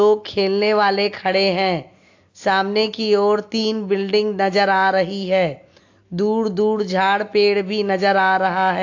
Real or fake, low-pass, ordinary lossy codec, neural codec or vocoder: real; 7.2 kHz; AAC, 48 kbps; none